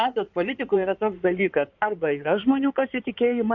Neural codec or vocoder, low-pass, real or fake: codec, 16 kHz in and 24 kHz out, 2.2 kbps, FireRedTTS-2 codec; 7.2 kHz; fake